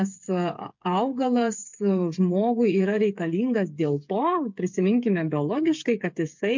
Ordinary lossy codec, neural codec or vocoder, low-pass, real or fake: MP3, 48 kbps; codec, 16 kHz, 8 kbps, FreqCodec, smaller model; 7.2 kHz; fake